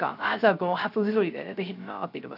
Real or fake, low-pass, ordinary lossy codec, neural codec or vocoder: fake; 5.4 kHz; AAC, 48 kbps; codec, 16 kHz, 0.3 kbps, FocalCodec